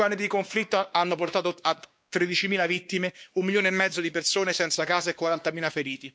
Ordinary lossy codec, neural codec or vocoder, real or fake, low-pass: none; codec, 16 kHz, 2 kbps, X-Codec, WavLM features, trained on Multilingual LibriSpeech; fake; none